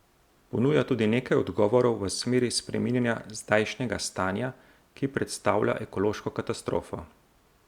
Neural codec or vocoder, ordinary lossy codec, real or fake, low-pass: none; Opus, 64 kbps; real; 19.8 kHz